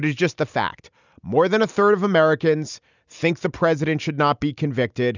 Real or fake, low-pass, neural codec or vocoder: real; 7.2 kHz; none